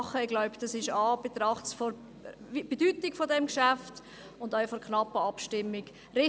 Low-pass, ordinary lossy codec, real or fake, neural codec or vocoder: none; none; real; none